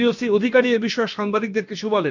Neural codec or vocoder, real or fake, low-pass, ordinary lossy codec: codec, 16 kHz, about 1 kbps, DyCAST, with the encoder's durations; fake; 7.2 kHz; none